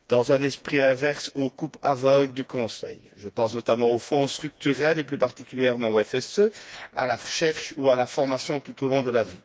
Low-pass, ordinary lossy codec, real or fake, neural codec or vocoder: none; none; fake; codec, 16 kHz, 2 kbps, FreqCodec, smaller model